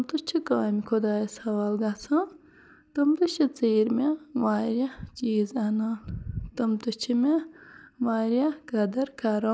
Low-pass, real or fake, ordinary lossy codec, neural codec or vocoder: none; real; none; none